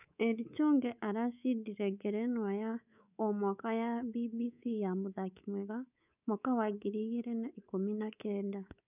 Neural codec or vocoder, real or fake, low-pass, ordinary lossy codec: codec, 24 kHz, 3.1 kbps, DualCodec; fake; 3.6 kHz; none